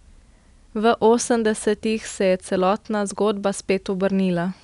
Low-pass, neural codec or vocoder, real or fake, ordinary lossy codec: 10.8 kHz; none; real; none